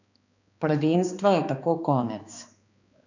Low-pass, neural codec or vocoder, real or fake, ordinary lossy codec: 7.2 kHz; codec, 16 kHz, 2 kbps, X-Codec, HuBERT features, trained on balanced general audio; fake; none